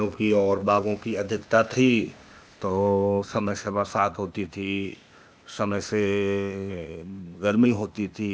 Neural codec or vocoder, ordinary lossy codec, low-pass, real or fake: codec, 16 kHz, 0.8 kbps, ZipCodec; none; none; fake